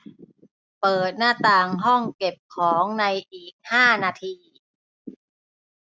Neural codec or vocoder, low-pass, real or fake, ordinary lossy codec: none; none; real; none